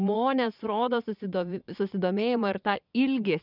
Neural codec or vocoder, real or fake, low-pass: vocoder, 22.05 kHz, 80 mel bands, Vocos; fake; 5.4 kHz